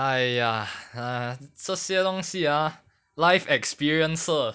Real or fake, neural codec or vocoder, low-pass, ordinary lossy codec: real; none; none; none